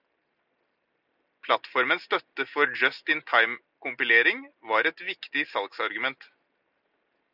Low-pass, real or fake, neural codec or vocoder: 5.4 kHz; real; none